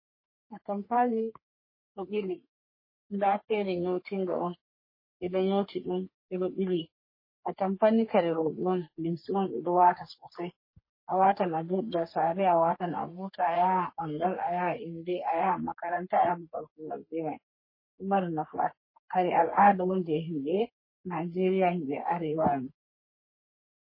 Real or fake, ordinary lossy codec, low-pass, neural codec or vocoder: fake; MP3, 24 kbps; 5.4 kHz; codec, 44.1 kHz, 3.4 kbps, Pupu-Codec